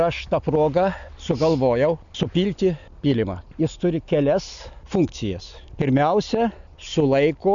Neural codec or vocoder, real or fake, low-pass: none; real; 7.2 kHz